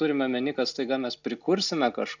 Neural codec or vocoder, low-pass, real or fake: none; 7.2 kHz; real